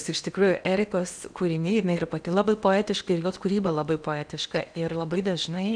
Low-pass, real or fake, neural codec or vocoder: 9.9 kHz; fake; codec, 16 kHz in and 24 kHz out, 0.8 kbps, FocalCodec, streaming, 65536 codes